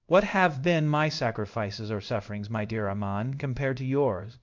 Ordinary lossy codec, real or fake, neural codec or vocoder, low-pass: MP3, 64 kbps; fake; codec, 16 kHz in and 24 kHz out, 1 kbps, XY-Tokenizer; 7.2 kHz